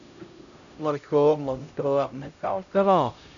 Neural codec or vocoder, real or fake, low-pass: codec, 16 kHz, 0.5 kbps, X-Codec, HuBERT features, trained on LibriSpeech; fake; 7.2 kHz